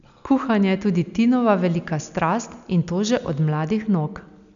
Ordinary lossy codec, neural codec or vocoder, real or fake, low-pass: none; none; real; 7.2 kHz